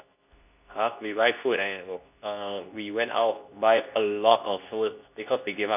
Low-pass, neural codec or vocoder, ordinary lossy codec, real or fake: 3.6 kHz; codec, 24 kHz, 0.9 kbps, WavTokenizer, medium speech release version 2; none; fake